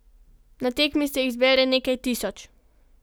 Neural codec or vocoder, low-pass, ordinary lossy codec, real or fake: none; none; none; real